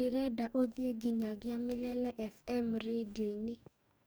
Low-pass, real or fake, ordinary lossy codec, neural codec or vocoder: none; fake; none; codec, 44.1 kHz, 2.6 kbps, DAC